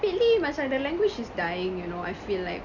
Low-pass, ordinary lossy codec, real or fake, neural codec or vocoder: 7.2 kHz; none; real; none